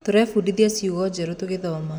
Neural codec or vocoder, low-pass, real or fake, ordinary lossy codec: none; none; real; none